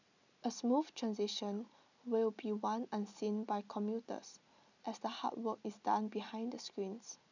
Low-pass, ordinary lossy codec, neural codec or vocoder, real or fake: 7.2 kHz; none; none; real